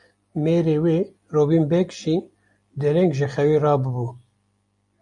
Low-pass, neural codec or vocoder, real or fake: 10.8 kHz; none; real